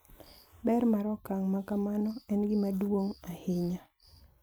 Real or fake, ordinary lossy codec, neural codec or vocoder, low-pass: fake; none; vocoder, 44.1 kHz, 128 mel bands every 256 samples, BigVGAN v2; none